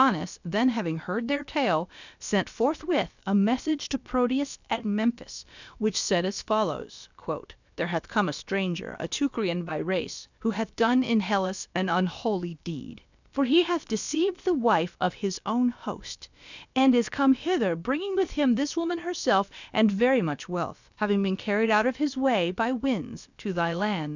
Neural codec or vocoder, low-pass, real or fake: codec, 16 kHz, about 1 kbps, DyCAST, with the encoder's durations; 7.2 kHz; fake